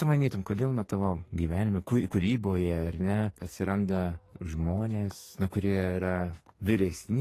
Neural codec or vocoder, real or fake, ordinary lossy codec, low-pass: codec, 44.1 kHz, 2.6 kbps, SNAC; fake; AAC, 48 kbps; 14.4 kHz